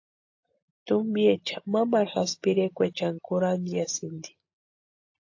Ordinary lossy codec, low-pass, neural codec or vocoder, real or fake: AAC, 48 kbps; 7.2 kHz; none; real